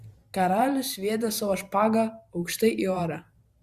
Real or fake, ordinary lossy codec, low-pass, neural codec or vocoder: fake; Opus, 64 kbps; 14.4 kHz; vocoder, 44.1 kHz, 128 mel bands every 512 samples, BigVGAN v2